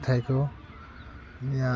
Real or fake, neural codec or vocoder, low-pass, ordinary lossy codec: real; none; none; none